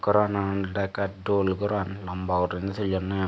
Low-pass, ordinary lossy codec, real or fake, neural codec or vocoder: none; none; real; none